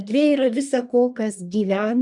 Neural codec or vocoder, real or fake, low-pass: codec, 24 kHz, 1 kbps, SNAC; fake; 10.8 kHz